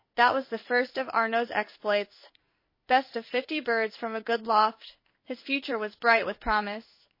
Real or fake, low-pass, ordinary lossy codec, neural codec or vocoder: fake; 5.4 kHz; MP3, 24 kbps; codec, 16 kHz in and 24 kHz out, 1 kbps, XY-Tokenizer